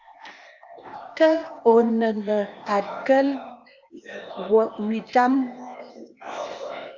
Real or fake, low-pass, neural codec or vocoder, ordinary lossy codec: fake; 7.2 kHz; codec, 16 kHz, 0.8 kbps, ZipCodec; Opus, 64 kbps